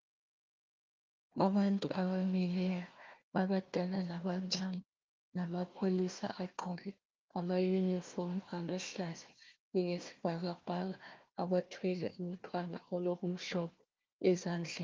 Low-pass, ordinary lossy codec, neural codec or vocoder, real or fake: 7.2 kHz; Opus, 32 kbps; codec, 16 kHz, 1 kbps, FunCodec, trained on Chinese and English, 50 frames a second; fake